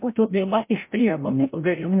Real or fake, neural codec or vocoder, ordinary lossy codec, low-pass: fake; codec, 16 kHz, 0.5 kbps, FreqCodec, larger model; MP3, 24 kbps; 3.6 kHz